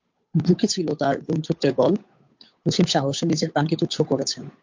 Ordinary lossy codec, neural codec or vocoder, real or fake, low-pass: MP3, 48 kbps; codec, 16 kHz, 8 kbps, FunCodec, trained on Chinese and English, 25 frames a second; fake; 7.2 kHz